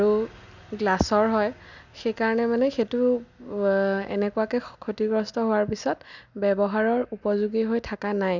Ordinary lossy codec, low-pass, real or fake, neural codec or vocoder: none; 7.2 kHz; real; none